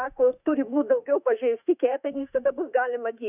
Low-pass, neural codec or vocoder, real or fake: 3.6 kHz; autoencoder, 48 kHz, 32 numbers a frame, DAC-VAE, trained on Japanese speech; fake